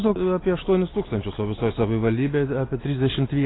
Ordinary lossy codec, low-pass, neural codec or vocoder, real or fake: AAC, 16 kbps; 7.2 kHz; none; real